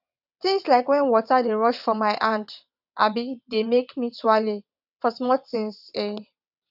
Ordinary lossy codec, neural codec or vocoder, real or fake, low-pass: none; vocoder, 22.05 kHz, 80 mel bands, WaveNeXt; fake; 5.4 kHz